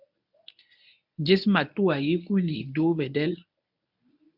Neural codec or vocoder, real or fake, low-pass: codec, 24 kHz, 0.9 kbps, WavTokenizer, medium speech release version 1; fake; 5.4 kHz